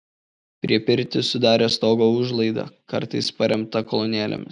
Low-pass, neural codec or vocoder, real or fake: 10.8 kHz; none; real